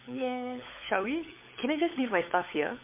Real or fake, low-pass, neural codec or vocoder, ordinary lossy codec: fake; 3.6 kHz; codec, 16 kHz, 16 kbps, FunCodec, trained on LibriTTS, 50 frames a second; MP3, 24 kbps